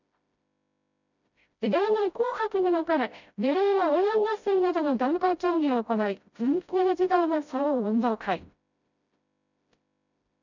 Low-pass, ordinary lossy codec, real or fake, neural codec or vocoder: 7.2 kHz; none; fake; codec, 16 kHz, 0.5 kbps, FreqCodec, smaller model